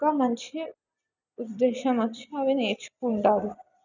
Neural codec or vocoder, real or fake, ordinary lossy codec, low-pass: none; real; none; 7.2 kHz